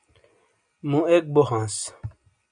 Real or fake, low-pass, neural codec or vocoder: real; 9.9 kHz; none